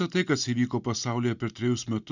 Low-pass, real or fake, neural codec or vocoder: 7.2 kHz; real; none